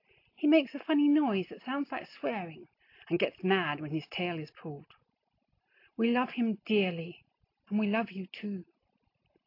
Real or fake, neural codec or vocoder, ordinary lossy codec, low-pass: real; none; AAC, 32 kbps; 5.4 kHz